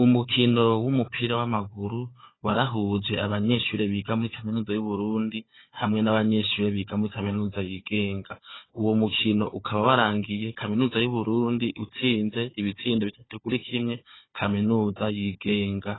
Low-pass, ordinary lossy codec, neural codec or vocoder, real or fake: 7.2 kHz; AAC, 16 kbps; codec, 16 kHz, 4 kbps, FunCodec, trained on Chinese and English, 50 frames a second; fake